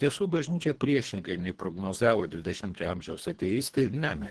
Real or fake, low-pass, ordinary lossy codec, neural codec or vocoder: fake; 10.8 kHz; Opus, 16 kbps; codec, 24 kHz, 1.5 kbps, HILCodec